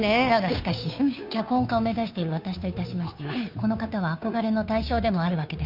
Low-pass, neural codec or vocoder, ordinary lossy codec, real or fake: 5.4 kHz; codec, 16 kHz, 2 kbps, FunCodec, trained on Chinese and English, 25 frames a second; none; fake